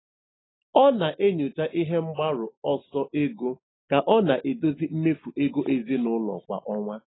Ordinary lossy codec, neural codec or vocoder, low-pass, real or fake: AAC, 16 kbps; none; 7.2 kHz; real